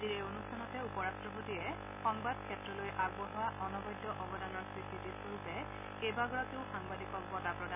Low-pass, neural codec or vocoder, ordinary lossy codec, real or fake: 3.6 kHz; none; none; real